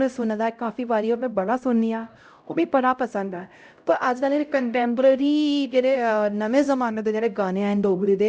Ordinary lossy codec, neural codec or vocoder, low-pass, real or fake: none; codec, 16 kHz, 0.5 kbps, X-Codec, HuBERT features, trained on LibriSpeech; none; fake